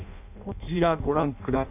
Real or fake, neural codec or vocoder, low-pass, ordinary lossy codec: fake; codec, 16 kHz in and 24 kHz out, 0.6 kbps, FireRedTTS-2 codec; 3.6 kHz; none